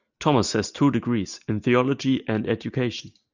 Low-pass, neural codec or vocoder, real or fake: 7.2 kHz; none; real